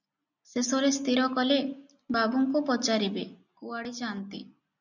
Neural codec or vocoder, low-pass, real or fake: none; 7.2 kHz; real